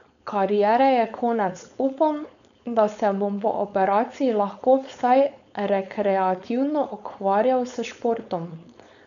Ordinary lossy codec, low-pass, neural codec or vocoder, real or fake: none; 7.2 kHz; codec, 16 kHz, 4.8 kbps, FACodec; fake